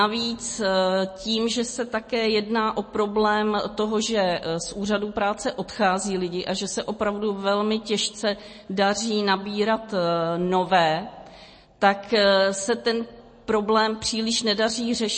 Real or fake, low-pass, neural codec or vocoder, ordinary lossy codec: real; 9.9 kHz; none; MP3, 32 kbps